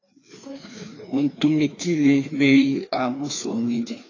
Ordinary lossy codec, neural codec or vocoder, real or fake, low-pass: AAC, 32 kbps; codec, 16 kHz, 2 kbps, FreqCodec, larger model; fake; 7.2 kHz